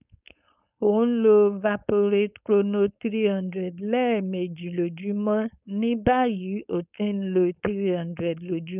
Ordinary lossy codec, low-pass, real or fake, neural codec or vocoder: none; 3.6 kHz; fake; codec, 16 kHz, 4.8 kbps, FACodec